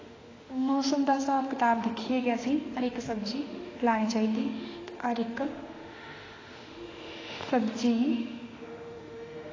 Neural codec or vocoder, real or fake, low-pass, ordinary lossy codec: autoencoder, 48 kHz, 32 numbers a frame, DAC-VAE, trained on Japanese speech; fake; 7.2 kHz; AAC, 32 kbps